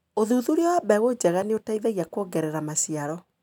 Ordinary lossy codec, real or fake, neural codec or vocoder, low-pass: none; real; none; 19.8 kHz